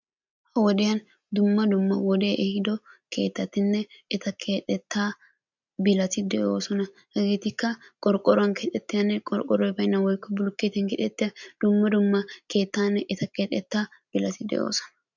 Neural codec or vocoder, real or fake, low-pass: none; real; 7.2 kHz